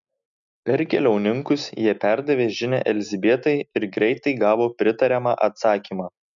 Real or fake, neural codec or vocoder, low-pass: real; none; 7.2 kHz